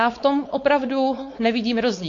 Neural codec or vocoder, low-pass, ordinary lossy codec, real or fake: codec, 16 kHz, 4.8 kbps, FACodec; 7.2 kHz; AAC, 48 kbps; fake